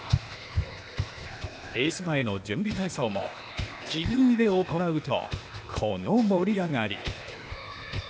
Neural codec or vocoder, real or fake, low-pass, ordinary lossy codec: codec, 16 kHz, 0.8 kbps, ZipCodec; fake; none; none